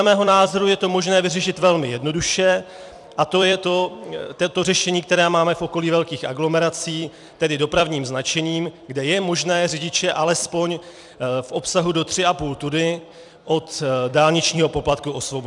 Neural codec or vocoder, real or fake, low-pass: vocoder, 44.1 kHz, 128 mel bands every 256 samples, BigVGAN v2; fake; 10.8 kHz